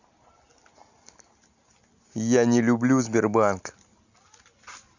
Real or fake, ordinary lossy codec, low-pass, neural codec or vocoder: real; none; 7.2 kHz; none